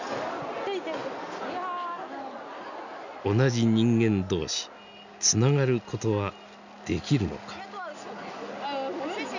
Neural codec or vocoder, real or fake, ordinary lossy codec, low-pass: none; real; none; 7.2 kHz